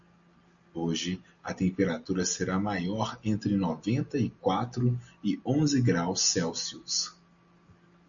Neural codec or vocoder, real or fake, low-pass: none; real; 7.2 kHz